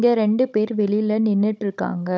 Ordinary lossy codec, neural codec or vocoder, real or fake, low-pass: none; codec, 16 kHz, 16 kbps, FunCodec, trained on Chinese and English, 50 frames a second; fake; none